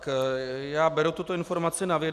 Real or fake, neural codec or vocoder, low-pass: real; none; 14.4 kHz